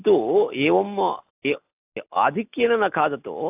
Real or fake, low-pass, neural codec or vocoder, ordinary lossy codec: real; 3.6 kHz; none; AAC, 32 kbps